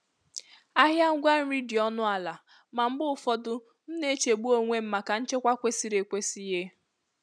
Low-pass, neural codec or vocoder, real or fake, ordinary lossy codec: none; none; real; none